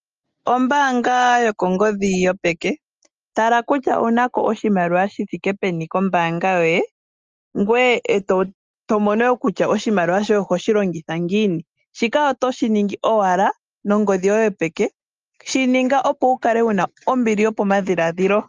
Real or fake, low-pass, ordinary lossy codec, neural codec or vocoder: real; 7.2 kHz; Opus, 24 kbps; none